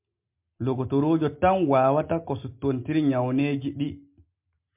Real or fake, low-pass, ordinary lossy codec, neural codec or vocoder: real; 3.6 kHz; MP3, 32 kbps; none